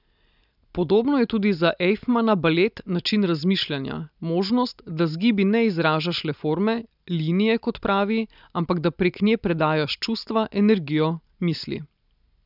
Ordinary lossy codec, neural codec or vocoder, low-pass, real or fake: none; none; 5.4 kHz; real